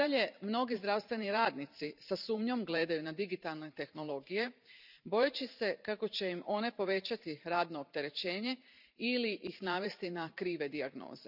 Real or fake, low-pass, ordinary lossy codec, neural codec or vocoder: fake; 5.4 kHz; none; vocoder, 44.1 kHz, 128 mel bands every 512 samples, BigVGAN v2